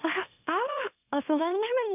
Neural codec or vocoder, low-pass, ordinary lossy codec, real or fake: autoencoder, 44.1 kHz, a latent of 192 numbers a frame, MeloTTS; 3.6 kHz; none; fake